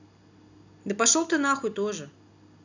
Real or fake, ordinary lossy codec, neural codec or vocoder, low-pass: real; none; none; 7.2 kHz